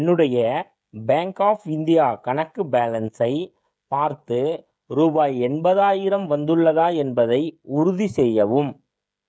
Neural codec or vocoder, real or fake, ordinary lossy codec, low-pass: codec, 16 kHz, 16 kbps, FreqCodec, smaller model; fake; none; none